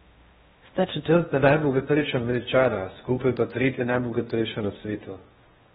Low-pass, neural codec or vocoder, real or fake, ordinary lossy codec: 10.8 kHz; codec, 16 kHz in and 24 kHz out, 0.8 kbps, FocalCodec, streaming, 65536 codes; fake; AAC, 16 kbps